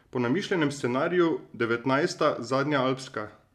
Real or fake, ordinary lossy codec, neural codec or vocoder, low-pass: real; none; none; 14.4 kHz